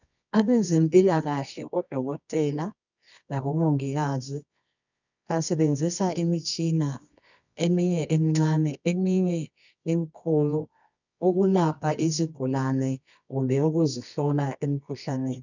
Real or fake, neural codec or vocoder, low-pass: fake; codec, 24 kHz, 0.9 kbps, WavTokenizer, medium music audio release; 7.2 kHz